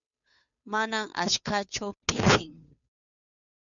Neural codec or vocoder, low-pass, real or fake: codec, 16 kHz, 2 kbps, FunCodec, trained on Chinese and English, 25 frames a second; 7.2 kHz; fake